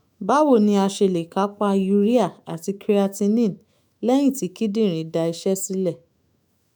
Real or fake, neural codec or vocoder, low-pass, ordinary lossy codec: fake; autoencoder, 48 kHz, 128 numbers a frame, DAC-VAE, trained on Japanese speech; none; none